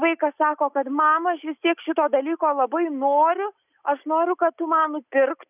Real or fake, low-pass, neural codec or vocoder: real; 3.6 kHz; none